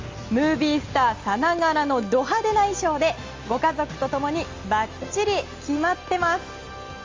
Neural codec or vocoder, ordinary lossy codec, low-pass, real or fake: none; Opus, 32 kbps; 7.2 kHz; real